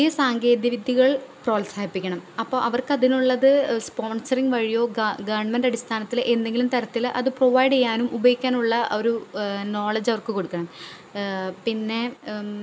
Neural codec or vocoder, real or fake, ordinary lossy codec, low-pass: none; real; none; none